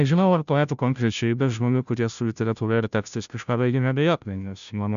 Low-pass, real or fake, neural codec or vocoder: 7.2 kHz; fake; codec, 16 kHz, 0.5 kbps, FunCodec, trained on Chinese and English, 25 frames a second